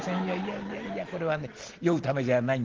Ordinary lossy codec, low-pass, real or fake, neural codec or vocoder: Opus, 16 kbps; 7.2 kHz; real; none